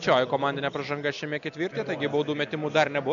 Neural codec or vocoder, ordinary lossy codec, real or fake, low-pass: none; MP3, 96 kbps; real; 7.2 kHz